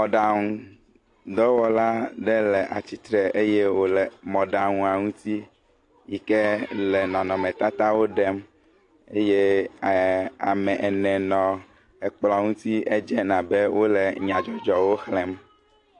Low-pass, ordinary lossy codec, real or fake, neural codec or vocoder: 10.8 kHz; AAC, 48 kbps; real; none